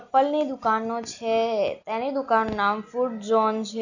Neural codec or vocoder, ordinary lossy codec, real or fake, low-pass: none; none; real; 7.2 kHz